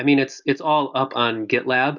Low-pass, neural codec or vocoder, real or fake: 7.2 kHz; none; real